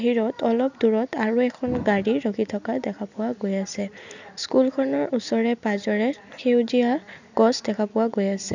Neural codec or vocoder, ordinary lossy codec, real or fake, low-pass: none; none; real; 7.2 kHz